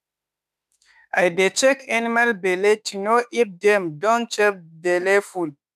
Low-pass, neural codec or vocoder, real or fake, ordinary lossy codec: 14.4 kHz; autoencoder, 48 kHz, 32 numbers a frame, DAC-VAE, trained on Japanese speech; fake; none